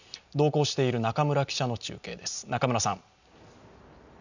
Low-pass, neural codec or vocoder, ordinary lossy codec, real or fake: 7.2 kHz; none; none; real